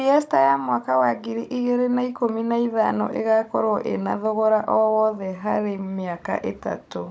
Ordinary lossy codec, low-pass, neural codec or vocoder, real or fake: none; none; codec, 16 kHz, 16 kbps, FunCodec, trained on Chinese and English, 50 frames a second; fake